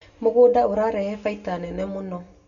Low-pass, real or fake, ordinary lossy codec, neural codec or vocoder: 7.2 kHz; real; none; none